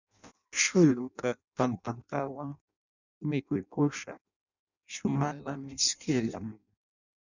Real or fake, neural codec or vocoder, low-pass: fake; codec, 16 kHz in and 24 kHz out, 0.6 kbps, FireRedTTS-2 codec; 7.2 kHz